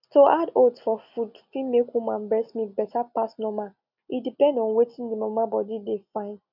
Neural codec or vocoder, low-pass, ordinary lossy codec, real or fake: none; 5.4 kHz; none; real